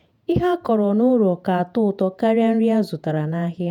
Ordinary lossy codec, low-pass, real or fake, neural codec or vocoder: none; 19.8 kHz; fake; vocoder, 48 kHz, 128 mel bands, Vocos